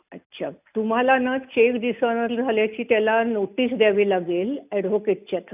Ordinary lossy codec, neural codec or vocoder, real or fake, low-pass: none; none; real; 3.6 kHz